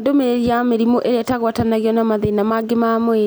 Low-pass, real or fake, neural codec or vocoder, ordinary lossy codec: none; real; none; none